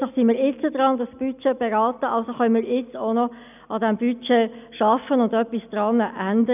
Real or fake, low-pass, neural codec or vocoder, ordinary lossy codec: real; 3.6 kHz; none; none